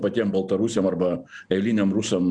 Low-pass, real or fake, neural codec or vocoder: 9.9 kHz; real; none